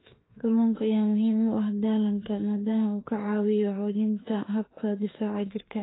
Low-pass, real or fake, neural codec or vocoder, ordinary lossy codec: 7.2 kHz; fake; autoencoder, 48 kHz, 32 numbers a frame, DAC-VAE, trained on Japanese speech; AAC, 16 kbps